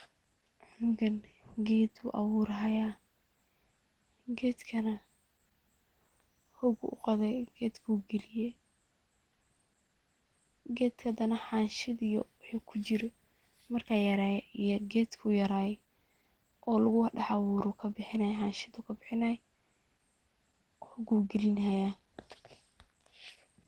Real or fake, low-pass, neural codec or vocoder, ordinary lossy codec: real; 19.8 kHz; none; Opus, 16 kbps